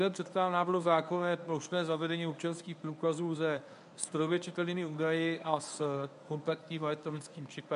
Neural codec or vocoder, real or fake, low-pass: codec, 24 kHz, 0.9 kbps, WavTokenizer, medium speech release version 1; fake; 10.8 kHz